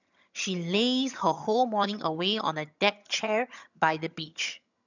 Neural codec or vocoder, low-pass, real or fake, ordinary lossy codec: vocoder, 22.05 kHz, 80 mel bands, HiFi-GAN; 7.2 kHz; fake; none